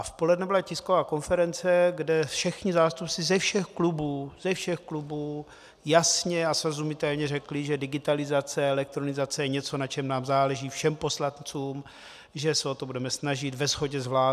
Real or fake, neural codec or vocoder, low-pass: real; none; 14.4 kHz